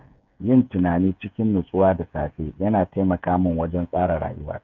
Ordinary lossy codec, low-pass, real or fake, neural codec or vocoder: none; 7.2 kHz; fake; codec, 16 kHz, 8 kbps, FreqCodec, smaller model